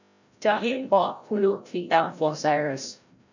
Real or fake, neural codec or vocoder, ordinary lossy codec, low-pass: fake; codec, 16 kHz, 0.5 kbps, FreqCodec, larger model; none; 7.2 kHz